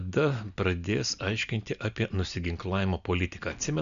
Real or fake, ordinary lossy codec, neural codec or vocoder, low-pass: real; MP3, 96 kbps; none; 7.2 kHz